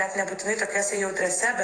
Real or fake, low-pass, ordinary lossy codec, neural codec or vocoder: fake; 9.9 kHz; AAC, 32 kbps; vocoder, 48 kHz, 128 mel bands, Vocos